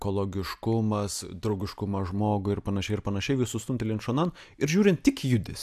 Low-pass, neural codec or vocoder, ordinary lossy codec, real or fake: 14.4 kHz; none; AAC, 96 kbps; real